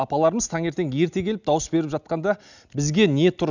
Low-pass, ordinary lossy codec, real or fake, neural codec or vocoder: 7.2 kHz; none; real; none